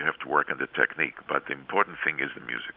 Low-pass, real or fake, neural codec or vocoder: 5.4 kHz; real; none